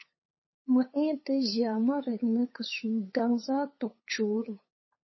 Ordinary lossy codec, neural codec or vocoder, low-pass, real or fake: MP3, 24 kbps; codec, 16 kHz, 8 kbps, FunCodec, trained on LibriTTS, 25 frames a second; 7.2 kHz; fake